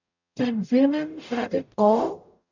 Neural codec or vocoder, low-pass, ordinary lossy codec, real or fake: codec, 44.1 kHz, 0.9 kbps, DAC; 7.2 kHz; none; fake